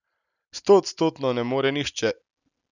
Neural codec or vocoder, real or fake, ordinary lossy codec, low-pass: none; real; none; 7.2 kHz